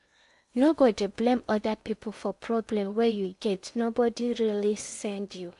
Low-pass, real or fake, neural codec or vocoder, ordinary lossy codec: 10.8 kHz; fake; codec, 16 kHz in and 24 kHz out, 0.8 kbps, FocalCodec, streaming, 65536 codes; MP3, 64 kbps